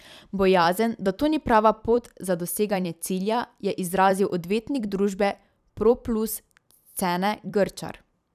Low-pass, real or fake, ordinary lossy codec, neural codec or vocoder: 14.4 kHz; fake; none; vocoder, 44.1 kHz, 128 mel bands every 256 samples, BigVGAN v2